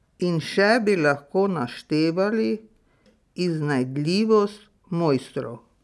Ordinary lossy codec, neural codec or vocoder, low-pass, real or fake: none; none; none; real